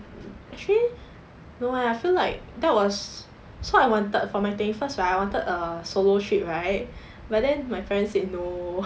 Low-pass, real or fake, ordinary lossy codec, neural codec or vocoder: none; real; none; none